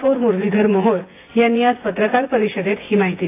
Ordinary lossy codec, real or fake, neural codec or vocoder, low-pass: AAC, 24 kbps; fake; vocoder, 24 kHz, 100 mel bands, Vocos; 3.6 kHz